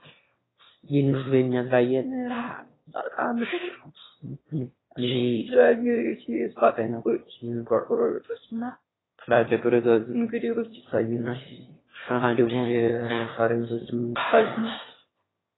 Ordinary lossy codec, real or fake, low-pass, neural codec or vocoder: AAC, 16 kbps; fake; 7.2 kHz; autoencoder, 22.05 kHz, a latent of 192 numbers a frame, VITS, trained on one speaker